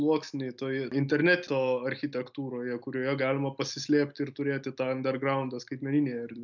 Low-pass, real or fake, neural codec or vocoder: 7.2 kHz; real; none